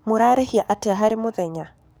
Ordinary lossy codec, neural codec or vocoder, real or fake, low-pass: none; codec, 44.1 kHz, 7.8 kbps, DAC; fake; none